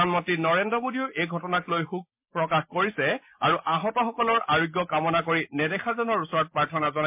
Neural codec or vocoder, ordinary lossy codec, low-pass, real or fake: none; MP3, 32 kbps; 3.6 kHz; real